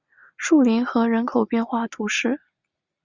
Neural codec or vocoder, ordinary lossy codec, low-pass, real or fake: none; Opus, 64 kbps; 7.2 kHz; real